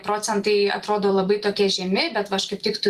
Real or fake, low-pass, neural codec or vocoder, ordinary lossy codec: fake; 14.4 kHz; vocoder, 44.1 kHz, 128 mel bands every 256 samples, BigVGAN v2; Opus, 24 kbps